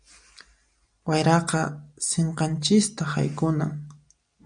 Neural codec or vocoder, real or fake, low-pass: none; real; 9.9 kHz